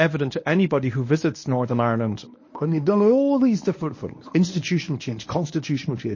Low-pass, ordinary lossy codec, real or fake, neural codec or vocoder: 7.2 kHz; MP3, 32 kbps; fake; codec, 24 kHz, 0.9 kbps, WavTokenizer, medium speech release version 1